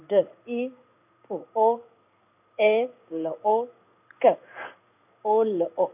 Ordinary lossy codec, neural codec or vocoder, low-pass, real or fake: none; codec, 16 kHz in and 24 kHz out, 1 kbps, XY-Tokenizer; 3.6 kHz; fake